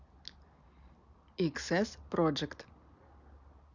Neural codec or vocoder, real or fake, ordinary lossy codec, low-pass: none; real; none; 7.2 kHz